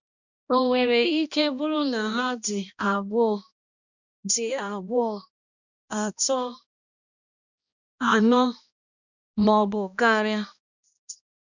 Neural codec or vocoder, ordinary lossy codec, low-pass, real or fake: codec, 16 kHz, 1 kbps, X-Codec, HuBERT features, trained on balanced general audio; none; 7.2 kHz; fake